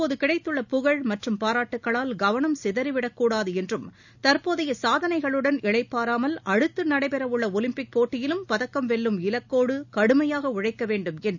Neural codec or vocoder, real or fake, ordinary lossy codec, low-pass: none; real; none; 7.2 kHz